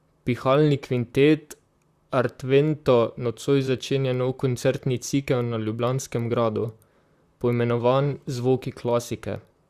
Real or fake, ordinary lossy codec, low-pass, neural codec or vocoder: fake; Opus, 64 kbps; 14.4 kHz; vocoder, 44.1 kHz, 128 mel bands, Pupu-Vocoder